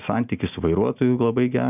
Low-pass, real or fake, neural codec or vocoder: 3.6 kHz; real; none